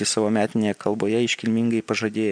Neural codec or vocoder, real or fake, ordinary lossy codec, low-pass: none; real; MP3, 96 kbps; 9.9 kHz